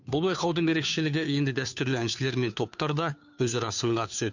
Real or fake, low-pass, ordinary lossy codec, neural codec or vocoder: fake; 7.2 kHz; none; codec, 16 kHz, 2 kbps, FunCodec, trained on Chinese and English, 25 frames a second